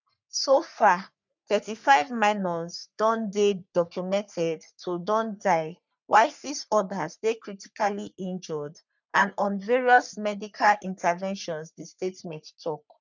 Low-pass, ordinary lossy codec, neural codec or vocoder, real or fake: 7.2 kHz; none; codec, 44.1 kHz, 3.4 kbps, Pupu-Codec; fake